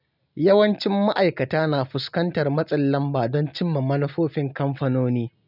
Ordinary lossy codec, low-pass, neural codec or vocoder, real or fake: none; 5.4 kHz; none; real